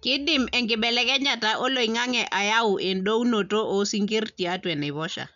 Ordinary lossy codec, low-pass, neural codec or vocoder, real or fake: none; 7.2 kHz; none; real